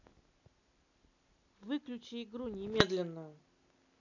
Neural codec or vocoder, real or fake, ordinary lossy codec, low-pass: none; real; none; 7.2 kHz